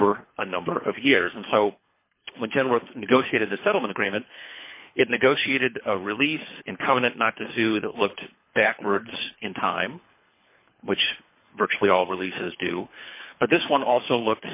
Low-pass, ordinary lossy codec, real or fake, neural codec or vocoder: 3.6 kHz; MP3, 24 kbps; fake; codec, 24 kHz, 3 kbps, HILCodec